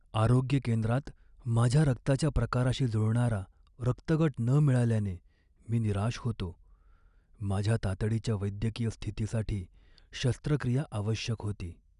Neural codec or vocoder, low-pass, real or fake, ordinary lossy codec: none; 10.8 kHz; real; none